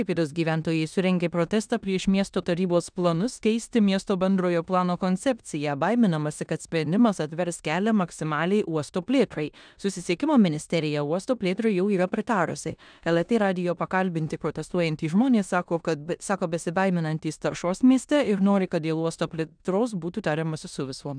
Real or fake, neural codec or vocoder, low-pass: fake; codec, 16 kHz in and 24 kHz out, 0.9 kbps, LongCat-Audio-Codec, four codebook decoder; 9.9 kHz